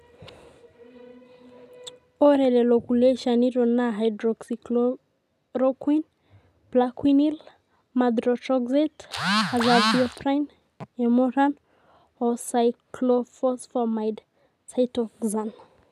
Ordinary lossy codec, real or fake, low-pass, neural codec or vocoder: none; real; 14.4 kHz; none